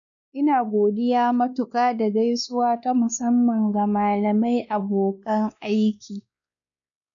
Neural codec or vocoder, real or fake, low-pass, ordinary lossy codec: codec, 16 kHz, 2 kbps, X-Codec, WavLM features, trained on Multilingual LibriSpeech; fake; 7.2 kHz; none